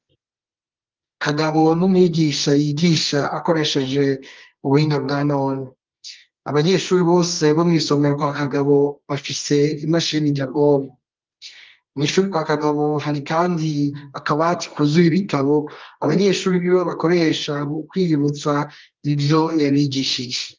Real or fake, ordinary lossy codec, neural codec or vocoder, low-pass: fake; Opus, 32 kbps; codec, 24 kHz, 0.9 kbps, WavTokenizer, medium music audio release; 7.2 kHz